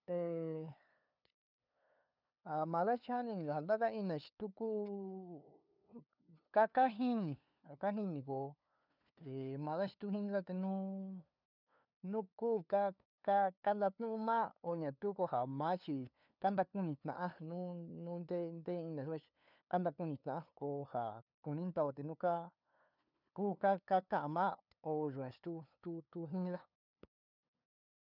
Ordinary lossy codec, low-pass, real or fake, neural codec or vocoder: none; 5.4 kHz; fake; codec, 16 kHz, 2 kbps, FunCodec, trained on LibriTTS, 25 frames a second